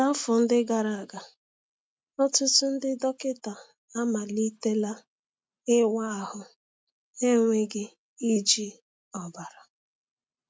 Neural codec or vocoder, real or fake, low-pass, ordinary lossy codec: none; real; none; none